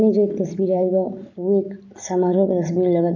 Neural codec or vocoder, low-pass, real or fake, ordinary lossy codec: none; 7.2 kHz; real; none